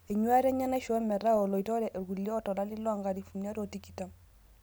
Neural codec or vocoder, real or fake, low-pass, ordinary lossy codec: none; real; none; none